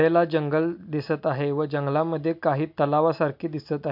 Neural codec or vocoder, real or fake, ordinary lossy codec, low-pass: none; real; MP3, 48 kbps; 5.4 kHz